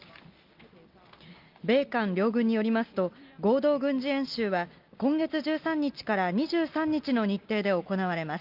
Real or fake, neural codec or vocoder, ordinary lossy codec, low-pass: real; none; Opus, 32 kbps; 5.4 kHz